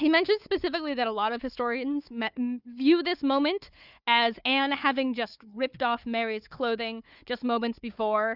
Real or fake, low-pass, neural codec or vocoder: fake; 5.4 kHz; codec, 16 kHz, 4 kbps, FunCodec, trained on Chinese and English, 50 frames a second